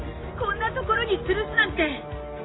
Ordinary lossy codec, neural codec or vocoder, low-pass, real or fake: AAC, 16 kbps; none; 7.2 kHz; real